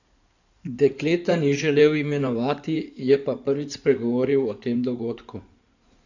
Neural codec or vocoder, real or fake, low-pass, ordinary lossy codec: codec, 16 kHz in and 24 kHz out, 2.2 kbps, FireRedTTS-2 codec; fake; 7.2 kHz; none